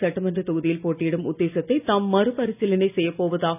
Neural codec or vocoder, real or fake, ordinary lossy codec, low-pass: none; real; none; 3.6 kHz